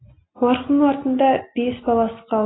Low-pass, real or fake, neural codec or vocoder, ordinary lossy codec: 7.2 kHz; real; none; AAC, 16 kbps